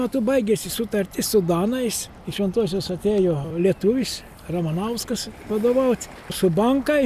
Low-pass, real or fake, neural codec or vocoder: 14.4 kHz; real; none